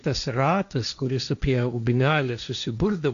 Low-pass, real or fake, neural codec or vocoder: 7.2 kHz; fake; codec, 16 kHz, 1.1 kbps, Voila-Tokenizer